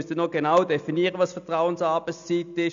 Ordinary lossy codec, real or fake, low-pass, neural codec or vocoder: MP3, 96 kbps; real; 7.2 kHz; none